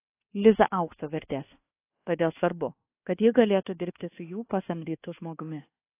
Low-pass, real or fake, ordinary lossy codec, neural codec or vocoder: 3.6 kHz; fake; AAC, 24 kbps; codec, 24 kHz, 1.2 kbps, DualCodec